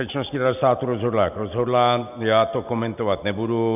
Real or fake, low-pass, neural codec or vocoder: real; 3.6 kHz; none